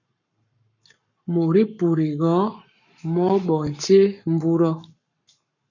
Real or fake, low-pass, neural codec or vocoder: fake; 7.2 kHz; codec, 44.1 kHz, 7.8 kbps, Pupu-Codec